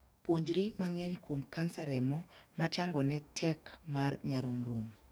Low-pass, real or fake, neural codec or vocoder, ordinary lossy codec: none; fake; codec, 44.1 kHz, 2.6 kbps, DAC; none